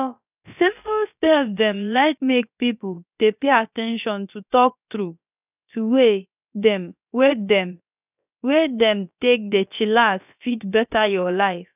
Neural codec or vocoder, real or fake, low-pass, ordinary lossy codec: codec, 16 kHz, about 1 kbps, DyCAST, with the encoder's durations; fake; 3.6 kHz; none